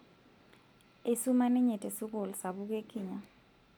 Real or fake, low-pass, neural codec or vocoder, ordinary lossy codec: real; 19.8 kHz; none; none